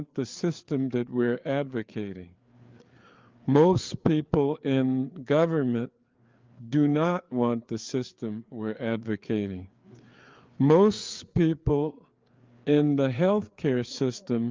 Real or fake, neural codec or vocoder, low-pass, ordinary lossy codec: fake; codec, 16 kHz, 4 kbps, FreqCodec, larger model; 7.2 kHz; Opus, 32 kbps